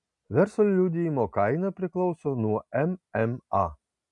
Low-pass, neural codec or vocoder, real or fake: 10.8 kHz; none; real